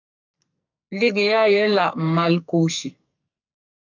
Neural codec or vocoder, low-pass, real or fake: codec, 44.1 kHz, 2.6 kbps, SNAC; 7.2 kHz; fake